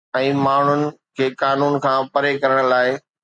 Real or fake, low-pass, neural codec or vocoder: real; 9.9 kHz; none